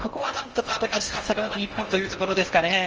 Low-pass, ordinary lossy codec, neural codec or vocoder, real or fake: 7.2 kHz; Opus, 24 kbps; codec, 16 kHz in and 24 kHz out, 0.6 kbps, FocalCodec, streaming, 4096 codes; fake